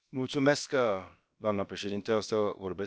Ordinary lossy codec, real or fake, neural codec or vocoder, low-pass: none; fake; codec, 16 kHz, about 1 kbps, DyCAST, with the encoder's durations; none